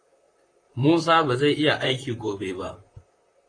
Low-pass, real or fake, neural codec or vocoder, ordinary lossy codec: 9.9 kHz; fake; vocoder, 44.1 kHz, 128 mel bands, Pupu-Vocoder; AAC, 32 kbps